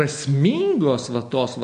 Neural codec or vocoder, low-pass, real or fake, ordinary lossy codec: none; 9.9 kHz; real; MP3, 48 kbps